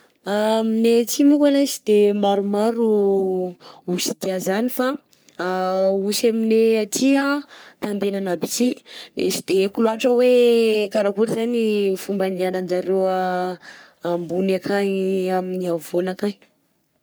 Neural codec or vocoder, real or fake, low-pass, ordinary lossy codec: codec, 44.1 kHz, 3.4 kbps, Pupu-Codec; fake; none; none